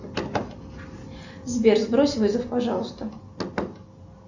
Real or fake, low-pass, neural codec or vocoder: real; 7.2 kHz; none